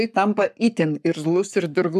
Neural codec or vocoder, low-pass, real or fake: codec, 44.1 kHz, 7.8 kbps, Pupu-Codec; 14.4 kHz; fake